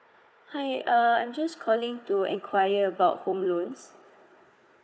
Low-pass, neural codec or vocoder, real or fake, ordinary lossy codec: none; codec, 16 kHz, 4 kbps, FunCodec, trained on Chinese and English, 50 frames a second; fake; none